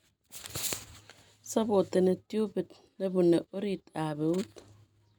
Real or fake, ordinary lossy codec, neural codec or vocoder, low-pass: real; none; none; none